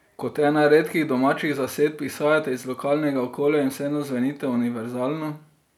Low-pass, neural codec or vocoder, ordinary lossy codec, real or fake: 19.8 kHz; none; none; real